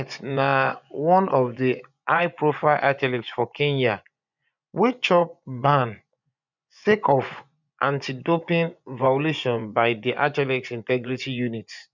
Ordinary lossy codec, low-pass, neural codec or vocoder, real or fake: none; 7.2 kHz; vocoder, 24 kHz, 100 mel bands, Vocos; fake